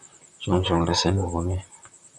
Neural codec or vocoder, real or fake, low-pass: vocoder, 44.1 kHz, 128 mel bands, Pupu-Vocoder; fake; 10.8 kHz